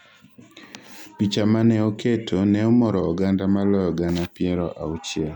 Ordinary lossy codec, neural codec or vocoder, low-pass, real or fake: none; none; 19.8 kHz; real